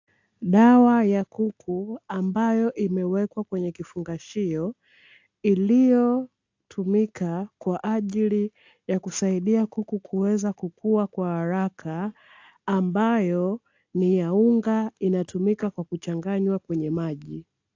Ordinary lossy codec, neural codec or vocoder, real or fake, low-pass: AAC, 48 kbps; none; real; 7.2 kHz